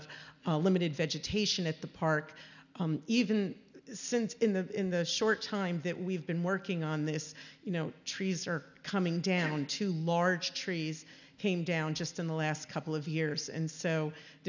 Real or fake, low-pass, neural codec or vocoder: real; 7.2 kHz; none